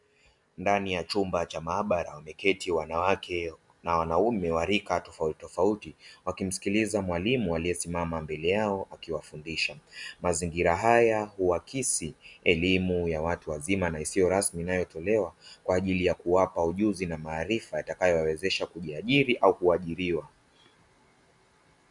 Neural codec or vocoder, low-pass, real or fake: none; 10.8 kHz; real